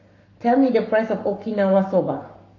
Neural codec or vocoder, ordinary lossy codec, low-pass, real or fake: codec, 44.1 kHz, 7.8 kbps, Pupu-Codec; AAC, 32 kbps; 7.2 kHz; fake